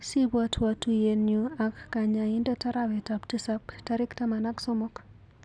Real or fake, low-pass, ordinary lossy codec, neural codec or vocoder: real; 9.9 kHz; none; none